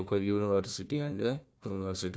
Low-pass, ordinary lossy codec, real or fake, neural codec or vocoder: none; none; fake; codec, 16 kHz, 1 kbps, FunCodec, trained on Chinese and English, 50 frames a second